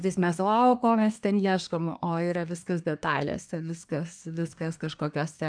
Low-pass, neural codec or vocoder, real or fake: 9.9 kHz; codec, 24 kHz, 1 kbps, SNAC; fake